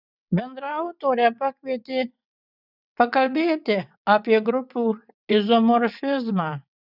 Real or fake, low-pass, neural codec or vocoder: fake; 5.4 kHz; vocoder, 22.05 kHz, 80 mel bands, WaveNeXt